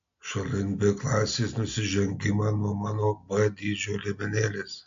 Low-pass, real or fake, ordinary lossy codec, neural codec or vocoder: 7.2 kHz; real; AAC, 64 kbps; none